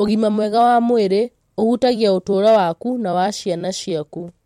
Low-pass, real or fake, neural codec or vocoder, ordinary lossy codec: 19.8 kHz; fake; vocoder, 44.1 kHz, 128 mel bands every 256 samples, BigVGAN v2; MP3, 64 kbps